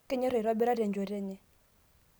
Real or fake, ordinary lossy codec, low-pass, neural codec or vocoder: real; none; none; none